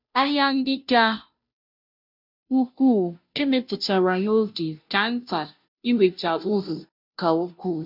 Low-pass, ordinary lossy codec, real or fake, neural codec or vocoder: 5.4 kHz; none; fake; codec, 16 kHz, 0.5 kbps, FunCodec, trained on Chinese and English, 25 frames a second